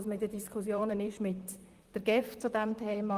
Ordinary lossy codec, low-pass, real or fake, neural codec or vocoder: Opus, 24 kbps; 14.4 kHz; fake; vocoder, 44.1 kHz, 128 mel bands, Pupu-Vocoder